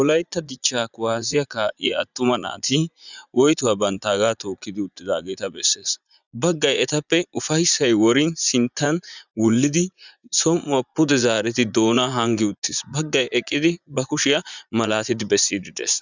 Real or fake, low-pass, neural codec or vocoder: fake; 7.2 kHz; vocoder, 44.1 kHz, 80 mel bands, Vocos